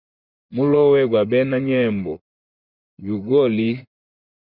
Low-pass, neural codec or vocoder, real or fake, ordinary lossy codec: 5.4 kHz; vocoder, 24 kHz, 100 mel bands, Vocos; fake; Opus, 64 kbps